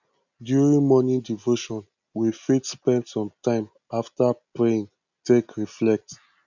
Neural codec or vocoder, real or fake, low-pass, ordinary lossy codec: none; real; 7.2 kHz; none